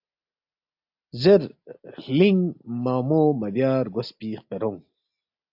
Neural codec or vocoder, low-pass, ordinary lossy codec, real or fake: none; 5.4 kHz; AAC, 48 kbps; real